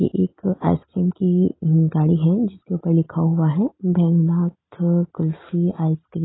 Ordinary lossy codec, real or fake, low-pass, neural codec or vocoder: AAC, 16 kbps; real; 7.2 kHz; none